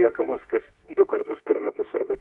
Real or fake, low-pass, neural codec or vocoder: fake; 10.8 kHz; codec, 24 kHz, 0.9 kbps, WavTokenizer, medium music audio release